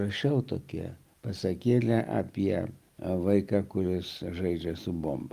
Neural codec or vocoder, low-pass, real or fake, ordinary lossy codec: none; 14.4 kHz; real; Opus, 32 kbps